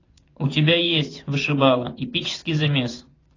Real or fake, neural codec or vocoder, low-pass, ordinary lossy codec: fake; vocoder, 24 kHz, 100 mel bands, Vocos; 7.2 kHz; AAC, 32 kbps